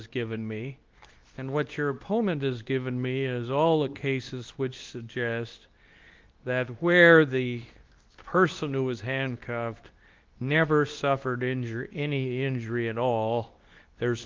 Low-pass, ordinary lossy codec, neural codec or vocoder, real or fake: 7.2 kHz; Opus, 32 kbps; codec, 24 kHz, 0.9 kbps, WavTokenizer, medium speech release version 2; fake